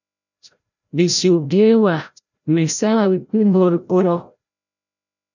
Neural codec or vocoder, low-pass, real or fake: codec, 16 kHz, 0.5 kbps, FreqCodec, larger model; 7.2 kHz; fake